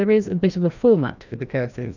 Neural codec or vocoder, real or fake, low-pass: codec, 16 kHz, 1 kbps, FreqCodec, larger model; fake; 7.2 kHz